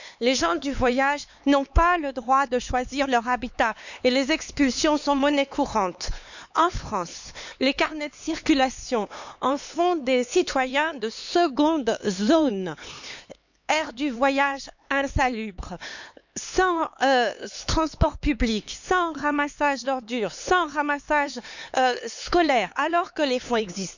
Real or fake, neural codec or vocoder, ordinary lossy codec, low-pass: fake; codec, 16 kHz, 4 kbps, X-Codec, HuBERT features, trained on LibriSpeech; none; 7.2 kHz